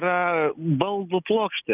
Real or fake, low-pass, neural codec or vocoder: real; 3.6 kHz; none